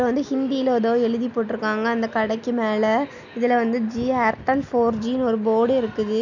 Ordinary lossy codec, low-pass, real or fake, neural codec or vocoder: none; 7.2 kHz; real; none